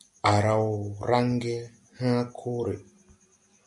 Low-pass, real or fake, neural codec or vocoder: 10.8 kHz; real; none